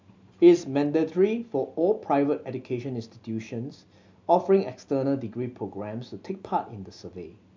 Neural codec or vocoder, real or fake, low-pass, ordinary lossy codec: none; real; 7.2 kHz; MP3, 64 kbps